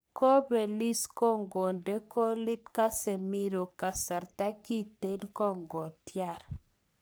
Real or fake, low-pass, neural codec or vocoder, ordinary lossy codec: fake; none; codec, 44.1 kHz, 3.4 kbps, Pupu-Codec; none